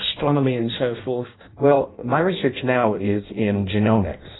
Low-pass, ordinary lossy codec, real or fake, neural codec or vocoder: 7.2 kHz; AAC, 16 kbps; fake; codec, 16 kHz in and 24 kHz out, 0.6 kbps, FireRedTTS-2 codec